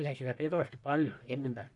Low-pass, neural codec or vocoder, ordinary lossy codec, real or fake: 10.8 kHz; codec, 44.1 kHz, 1.7 kbps, Pupu-Codec; none; fake